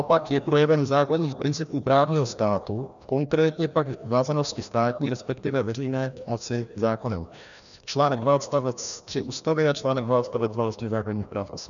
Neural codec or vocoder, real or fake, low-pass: codec, 16 kHz, 1 kbps, FreqCodec, larger model; fake; 7.2 kHz